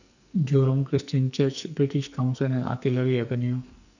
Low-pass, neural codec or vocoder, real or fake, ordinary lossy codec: 7.2 kHz; codec, 44.1 kHz, 2.6 kbps, SNAC; fake; none